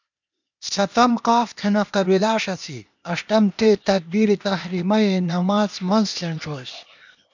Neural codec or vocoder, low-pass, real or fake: codec, 16 kHz, 0.8 kbps, ZipCodec; 7.2 kHz; fake